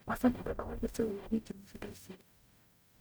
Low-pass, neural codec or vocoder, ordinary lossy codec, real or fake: none; codec, 44.1 kHz, 0.9 kbps, DAC; none; fake